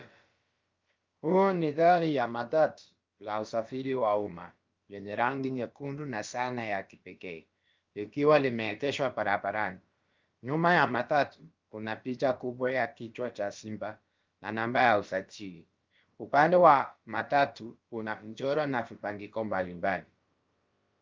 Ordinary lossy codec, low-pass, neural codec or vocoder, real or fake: Opus, 32 kbps; 7.2 kHz; codec, 16 kHz, about 1 kbps, DyCAST, with the encoder's durations; fake